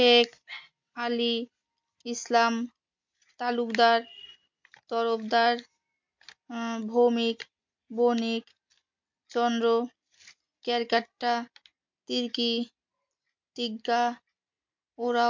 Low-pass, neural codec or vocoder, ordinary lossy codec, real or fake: 7.2 kHz; none; MP3, 48 kbps; real